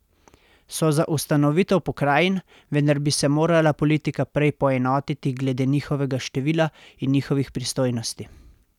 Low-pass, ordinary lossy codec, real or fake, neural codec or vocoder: 19.8 kHz; none; real; none